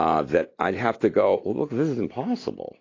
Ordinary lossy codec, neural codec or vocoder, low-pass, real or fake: AAC, 32 kbps; none; 7.2 kHz; real